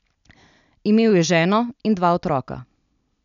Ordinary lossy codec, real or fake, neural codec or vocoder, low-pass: none; real; none; 7.2 kHz